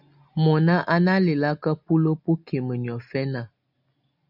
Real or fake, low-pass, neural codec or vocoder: real; 5.4 kHz; none